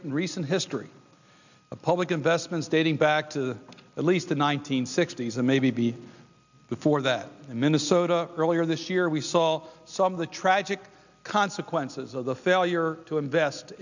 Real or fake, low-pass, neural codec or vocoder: real; 7.2 kHz; none